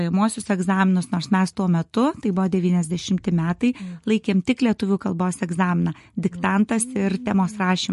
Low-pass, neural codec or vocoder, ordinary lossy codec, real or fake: 14.4 kHz; none; MP3, 48 kbps; real